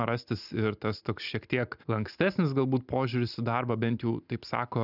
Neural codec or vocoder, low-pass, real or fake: none; 5.4 kHz; real